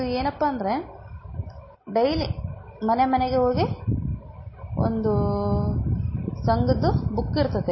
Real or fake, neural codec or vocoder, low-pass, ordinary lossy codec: real; none; 7.2 kHz; MP3, 24 kbps